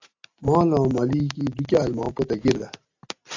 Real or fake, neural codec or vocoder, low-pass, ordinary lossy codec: real; none; 7.2 kHz; AAC, 48 kbps